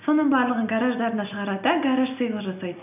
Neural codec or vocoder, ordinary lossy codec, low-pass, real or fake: none; none; 3.6 kHz; real